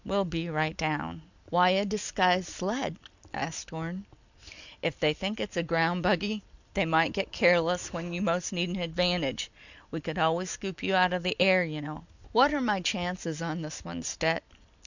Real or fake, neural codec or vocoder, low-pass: real; none; 7.2 kHz